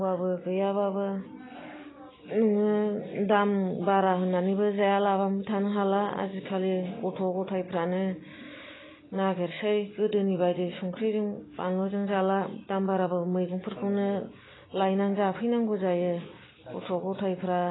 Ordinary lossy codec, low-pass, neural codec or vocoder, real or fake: AAC, 16 kbps; 7.2 kHz; none; real